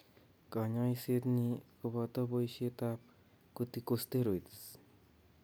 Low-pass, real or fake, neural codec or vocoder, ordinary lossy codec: none; real; none; none